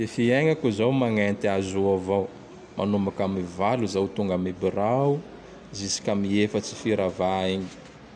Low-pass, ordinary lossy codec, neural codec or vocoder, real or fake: 9.9 kHz; none; none; real